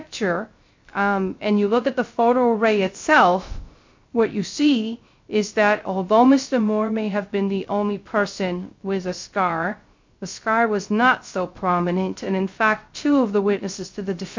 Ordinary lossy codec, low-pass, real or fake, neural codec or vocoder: MP3, 48 kbps; 7.2 kHz; fake; codec, 16 kHz, 0.3 kbps, FocalCodec